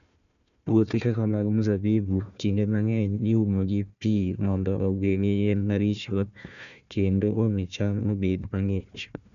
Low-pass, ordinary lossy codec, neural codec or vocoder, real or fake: 7.2 kHz; none; codec, 16 kHz, 1 kbps, FunCodec, trained on Chinese and English, 50 frames a second; fake